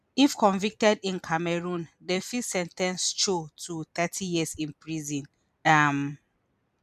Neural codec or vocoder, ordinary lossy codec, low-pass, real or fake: vocoder, 44.1 kHz, 128 mel bands every 256 samples, BigVGAN v2; none; 14.4 kHz; fake